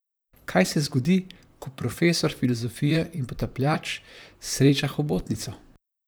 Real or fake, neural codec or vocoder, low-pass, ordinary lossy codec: fake; vocoder, 44.1 kHz, 128 mel bands, Pupu-Vocoder; none; none